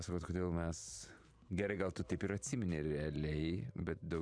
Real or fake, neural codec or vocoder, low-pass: fake; vocoder, 44.1 kHz, 128 mel bands every 512 samples, BigVGAN v2; 9.9 kHz